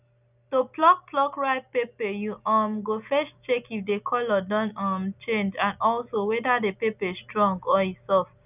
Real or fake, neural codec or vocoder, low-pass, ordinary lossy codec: real; none; 3.6 kHz; none